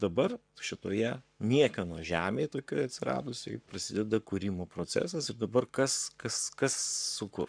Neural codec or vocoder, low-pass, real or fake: codec, 44.1 kHz, 7.8 kbps, Pupu-Codec; 9.9 kHz; fake